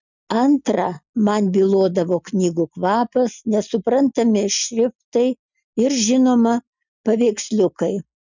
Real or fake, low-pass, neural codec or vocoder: real; 7.2 kHz; none